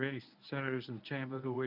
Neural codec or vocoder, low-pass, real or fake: codec, 24 kHz, 0.9 kbps, WavTokenizer, medium speech release version 1; 5.4 kHz; fake